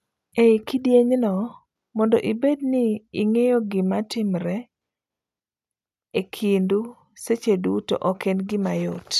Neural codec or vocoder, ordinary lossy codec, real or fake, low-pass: none; none; real; 14.4 kHz